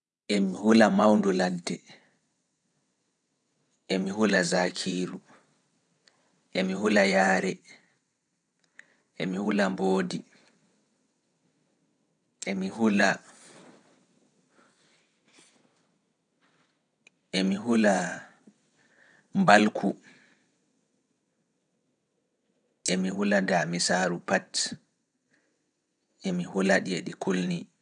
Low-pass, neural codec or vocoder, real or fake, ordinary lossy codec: 9.9 kHz; vocoder, 22.05 kHz, 80 mel bands, WaveNeXt; fake; none